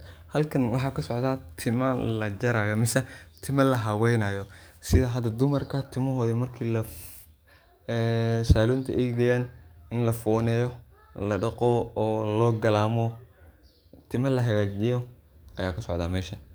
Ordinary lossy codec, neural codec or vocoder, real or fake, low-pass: none; codec, 44.1 kHz, 7.8 kbps, DAC; fake; none